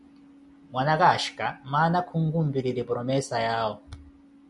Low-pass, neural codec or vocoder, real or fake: 10.8 kHz; none; real